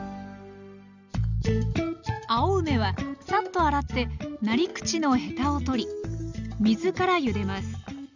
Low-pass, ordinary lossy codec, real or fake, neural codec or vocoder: 7.2 kHz; none; real; none